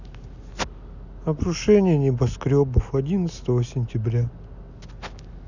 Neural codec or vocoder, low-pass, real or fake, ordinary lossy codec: none; 7.2 kHz; real; none